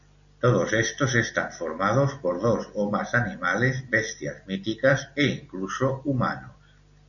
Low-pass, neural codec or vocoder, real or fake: 7.2 kHz; none; real